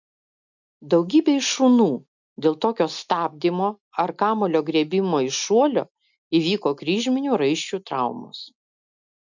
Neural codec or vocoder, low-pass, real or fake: none; 7.2 kHz; real